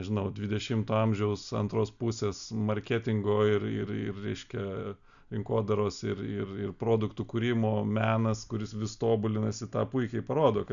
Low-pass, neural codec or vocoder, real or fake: 7.2 kHz; none; real